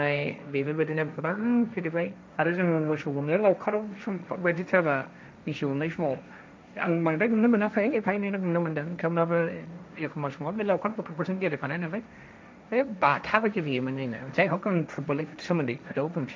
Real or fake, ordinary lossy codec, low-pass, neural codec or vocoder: fake; none; none; codec, 16 kHz, 1.1 kbps, Voila-Tokenizer